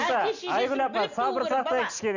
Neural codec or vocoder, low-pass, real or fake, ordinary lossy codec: none; 7.2 kHz; real; none